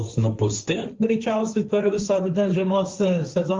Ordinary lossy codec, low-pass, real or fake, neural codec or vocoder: Opus, 32 kbps; 7.2 kHz; fake; codec, 16 kHz, 1.1 kbps, Voila-Tokenizer